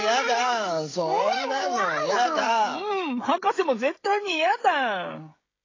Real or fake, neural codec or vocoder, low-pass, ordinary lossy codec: fake; codec, 16 kHz, 16 kbps, FreqCodec, smaller model; 7.2 kHz; AAC, 32 kbps